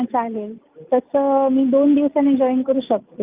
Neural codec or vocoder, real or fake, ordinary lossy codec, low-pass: none; real; Opus, 24 kbps; 3.6 kHz